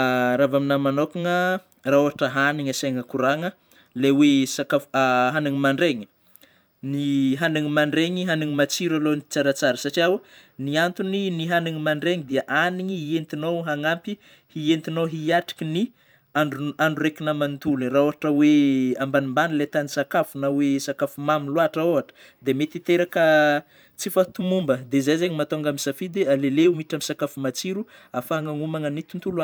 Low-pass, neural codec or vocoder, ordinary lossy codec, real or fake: none; vocoder, 44.1 kHz, 128 mel bands every 512 samples, BigVGAN v2; none; fake